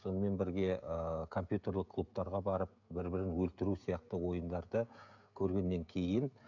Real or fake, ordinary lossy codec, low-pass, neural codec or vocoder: fake; none; 7.2 kHz; codec, 16 kHz, 16 kbps, FreqCodec, smaller model